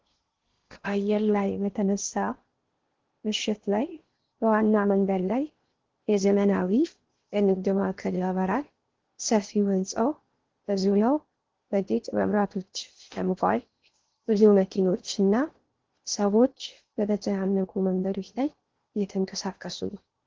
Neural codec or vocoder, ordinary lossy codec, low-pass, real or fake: codec, 16 kHz in and 24 kHz out, 0.6 kbps, FocalCodec, streaming, 2048 codes; Opus, 16 kbps; 7.2 kHz; fake